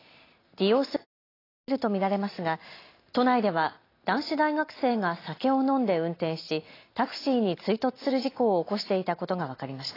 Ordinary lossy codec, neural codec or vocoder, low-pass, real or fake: AAC, 24 kbps; none; 5.4 kHz; real